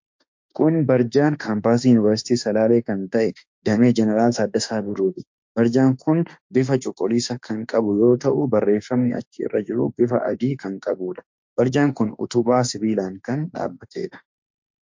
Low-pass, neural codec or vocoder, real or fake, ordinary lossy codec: 7.2 kHz; autoencoder, 48 kHz, 32 numbers a frame, DAC-VAE, trained on Japanese speech; fake; MP3, 48 kbps